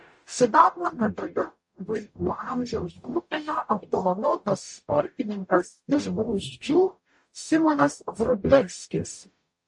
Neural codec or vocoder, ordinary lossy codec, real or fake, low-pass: codec, 44.1 kHz, 0.9 kbps, DAC; MP3, 48 kbps; fake; 10.8 kHz